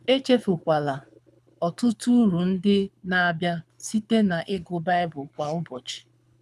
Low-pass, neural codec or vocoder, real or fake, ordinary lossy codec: none; codec, 24 kHz, 6 kbps, HILCodec; fake; none